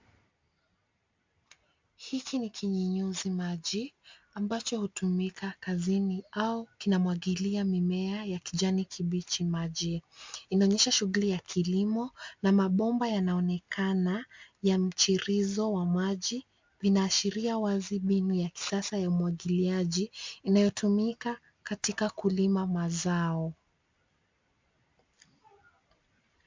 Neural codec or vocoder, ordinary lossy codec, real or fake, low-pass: none; MP3, 64 kbps; real; 7.2 kHz